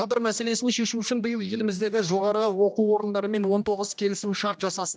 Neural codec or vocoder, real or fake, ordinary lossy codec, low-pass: codec, 16 kHz, 1 kbps, X-Codec, HuBERT features, trained on general audio; fake; none; none